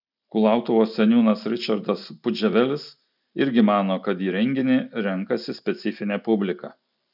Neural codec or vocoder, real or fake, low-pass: none; real; 5.4 kHz